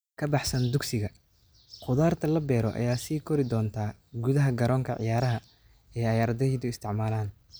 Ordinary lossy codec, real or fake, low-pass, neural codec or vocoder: none; real; none; none